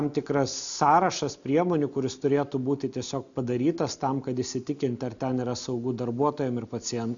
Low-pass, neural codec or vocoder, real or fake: 7.2 kHz; none; real